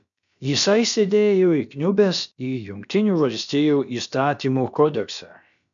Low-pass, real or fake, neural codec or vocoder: 7.2 kHz; fake; codec, 16 kHz, about 1 kbps, DyCAST, with the encoder's durations